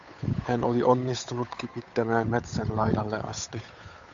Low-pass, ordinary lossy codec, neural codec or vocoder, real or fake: 7.2 kHz; AAC, 64 kbps; codec, 16 kHz, 8 kbps, FunCodec, trained on Chinese and English, 25 frames a second; fake